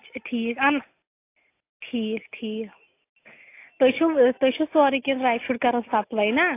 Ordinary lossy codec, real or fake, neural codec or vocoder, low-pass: AAC, 24 kbps; real; none; 3.6 kHz